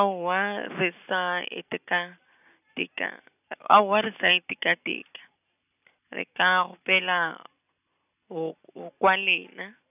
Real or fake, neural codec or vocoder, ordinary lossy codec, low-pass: real; none; none; 3.6 kHz